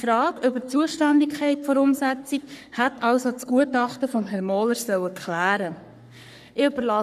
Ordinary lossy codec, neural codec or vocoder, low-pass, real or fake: none; codec, 44.1 kHz, 3.4 kbps, Pupu-Codec; 14.4 kHz; fake